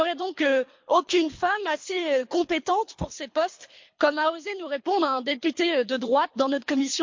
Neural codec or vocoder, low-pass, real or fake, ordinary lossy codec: codec, 24 kHz, 3 kbps, HILCodec; 7.2 kHz; fake; MP3, 48 kbps